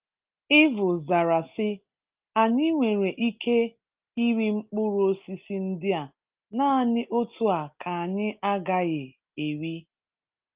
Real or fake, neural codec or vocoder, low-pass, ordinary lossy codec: real; none; 3.6 kHz; Opus, 32 kbps